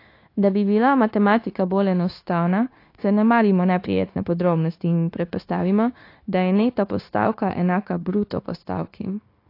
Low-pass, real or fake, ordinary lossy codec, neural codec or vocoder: 5.4 kHz; fake; AAC, 32 kbps; codec, 16 kHz, 0.9 kbps, LongCat-Audio-Codec